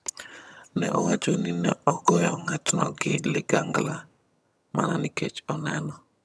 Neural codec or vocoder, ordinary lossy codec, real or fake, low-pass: vocoder, 22.05 kHz, 80 mel bands, HiFi-GAN; none; fake; none